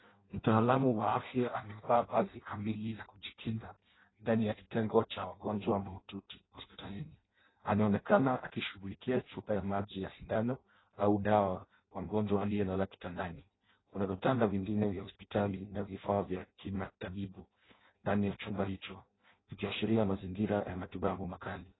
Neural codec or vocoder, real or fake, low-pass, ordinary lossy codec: codec, 16 kHz in and 24 kHz out, 0.6 kbps, FireRedTTS-2 codec; fake; 7.2 kHz; AAC, 16 kbps